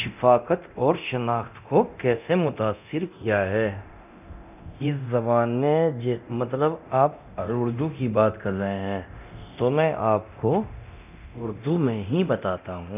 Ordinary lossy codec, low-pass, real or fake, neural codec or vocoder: none; 3.6 kHz; fake; codec, 24 kHz, 0.9 kbps, DualCodec